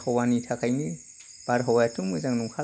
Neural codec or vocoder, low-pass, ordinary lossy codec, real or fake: none; none; none; real